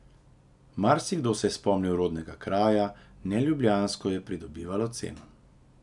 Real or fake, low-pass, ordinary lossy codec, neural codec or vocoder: real; 10.8 kHz; none; none